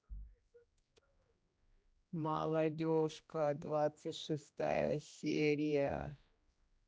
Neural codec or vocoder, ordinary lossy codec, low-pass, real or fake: codec, 16 kHz, 1 kbps, X-Codec, HuBERT features, trained on general audio; none; none; fake